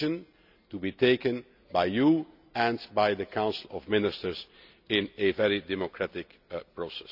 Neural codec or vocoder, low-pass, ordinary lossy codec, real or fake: none; 5.4 kHz; none; real